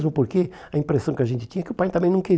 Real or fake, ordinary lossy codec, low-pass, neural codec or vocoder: real; none; none; none